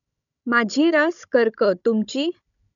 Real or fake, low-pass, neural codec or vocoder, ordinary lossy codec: fake; 7.2 kHz; codec, 16 kHz, 16 kbps, FunCodec, trained on Chinese and English, 50 frames a second; none